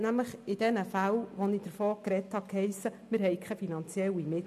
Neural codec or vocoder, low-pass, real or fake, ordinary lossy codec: none; 14.4 kHz; real; MP3, 64 kbps